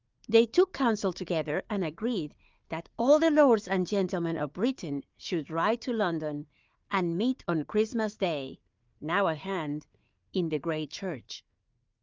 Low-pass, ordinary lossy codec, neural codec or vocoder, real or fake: 7.2 kHz; Opus, 24 kbps; codec, 16 kHz, 4 kbps, FunCodec, trained on Chinese and English, 50 frames a second; fake